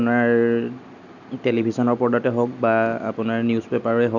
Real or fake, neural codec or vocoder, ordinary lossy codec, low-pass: real; none; none; 7.2 kHz